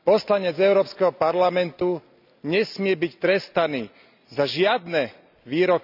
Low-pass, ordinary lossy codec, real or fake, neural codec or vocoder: 5.4 kHz; none; real; none